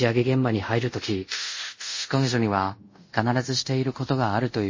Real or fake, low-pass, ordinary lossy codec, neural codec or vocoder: fake; 7.2 kHz; MP3, 32 kbps; codec, 24 kHz, 0.5 kbps, DualCodec